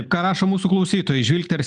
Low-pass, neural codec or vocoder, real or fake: 10.8 kHz; none; real